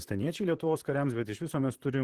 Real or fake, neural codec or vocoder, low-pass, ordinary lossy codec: fake; vocoder, 44.1 kHz, 128 mel bands, Pupu-Vocoder; 14.4 kHz; Opus, 16 kbps